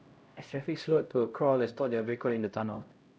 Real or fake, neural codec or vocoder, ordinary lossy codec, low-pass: fake; codec, 16 kHz, 0.5 kbps, X-Codec, HuBERT features, trained on LibriSpeech; none; none